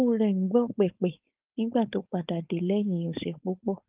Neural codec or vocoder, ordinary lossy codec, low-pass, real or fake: codec, 16 kHz, 4.8 kbps, FACodec; Opus, 32 kbps; 3.6 kHz; fake